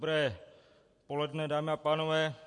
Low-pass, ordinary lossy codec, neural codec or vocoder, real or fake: 10.8 kHz; MP3, 48 kbps; none; real